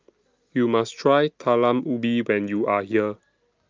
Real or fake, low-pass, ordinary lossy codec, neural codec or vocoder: real; 7.2 kHz; Opus, 24 kbps; none